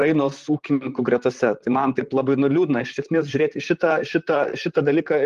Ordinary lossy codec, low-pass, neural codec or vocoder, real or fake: Opus, 24 kbps; 14.4 kHz; vocoder, 44.1 kHz, 128 mel bands, Pupu-Vocoder; fake